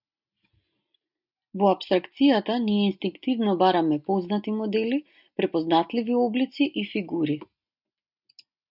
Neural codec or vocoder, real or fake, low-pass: none; real; 5.4 kHz